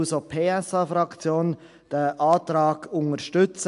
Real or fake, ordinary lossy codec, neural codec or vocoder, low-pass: real; AAC, 64 kbps; none; 10.8 kHz